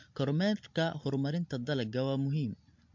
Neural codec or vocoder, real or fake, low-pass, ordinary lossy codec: none; real; 7.2 kHz; MP3, 48 kbps